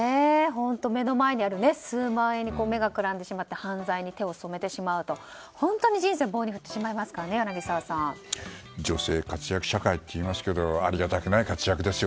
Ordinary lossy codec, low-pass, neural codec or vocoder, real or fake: none; none; none; real